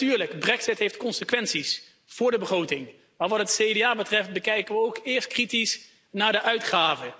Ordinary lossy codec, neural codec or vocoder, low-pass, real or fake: none; none; none; real